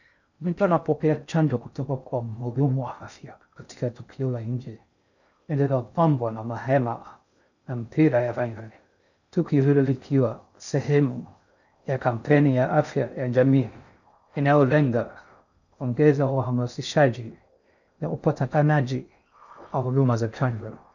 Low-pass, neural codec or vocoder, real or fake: 7.2 kHz; codec, 16 kHz in and 24 kHz out, 0.6 kbps, FocalCodec, streaming, 4096 codes; fake